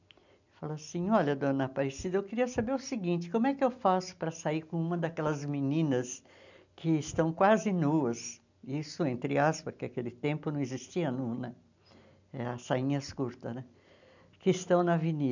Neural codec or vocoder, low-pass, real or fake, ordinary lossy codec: none; 7.2 kHz; real; none